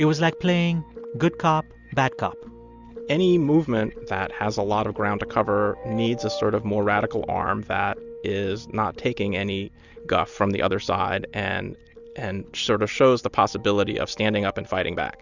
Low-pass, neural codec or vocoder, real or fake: 7.2 kHz; none; real